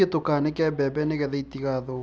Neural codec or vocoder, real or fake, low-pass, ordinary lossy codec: none; real; none; none